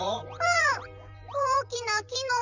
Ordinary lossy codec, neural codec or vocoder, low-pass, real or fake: none; vocoder, 44.1 kHz, 128 mel bands, Pupu-Vocoder; 7.2 kHz; fake